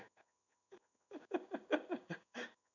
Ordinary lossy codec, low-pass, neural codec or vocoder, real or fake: none; 7.2 kHz; codec, 16 kHz in and 24 kHz out, 1 kbps, XY-Tokenizer; fake